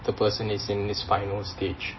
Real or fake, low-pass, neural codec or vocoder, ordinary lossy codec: real; 7.2 kHz; none; MP3, 24 kbps